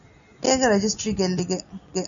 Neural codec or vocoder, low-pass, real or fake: none; 7.2 kHz; real